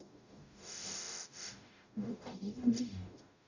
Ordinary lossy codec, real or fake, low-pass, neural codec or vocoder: none; fake; 7.2 kHz; codec, 44.1 kHz, 0.9 kbps, DAC